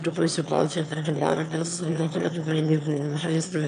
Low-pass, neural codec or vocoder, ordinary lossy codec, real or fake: 9.9 kHz; autoencoder, 22.05 kHz, a latent of 192 numbers a frame, VITS, trained on one speaker; MP3, 96 kbps; fake